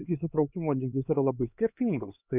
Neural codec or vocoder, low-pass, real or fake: codec, 16 kHz, 2 kbps, X-Codec, HuBERT features, trained on LibriSpeech; 3.6 kHz; fake